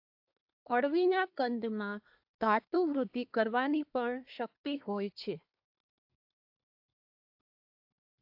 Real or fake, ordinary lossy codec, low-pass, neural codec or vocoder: fake; AAC, 48 kbps; 5.4 kHz; codec, 24 kHz, 1 kbps, SNAC